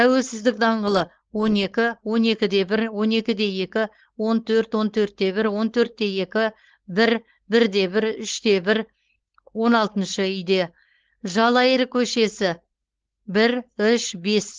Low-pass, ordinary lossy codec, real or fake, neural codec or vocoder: 7.2 kHz; Opus, 16 kbps; fake; codec, 16 kHz, 4.8 kbps, FACodec